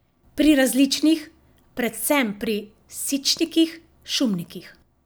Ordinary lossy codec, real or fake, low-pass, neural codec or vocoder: none; real; none; none